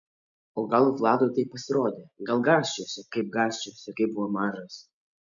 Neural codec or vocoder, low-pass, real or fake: none; 7.2 kHz; real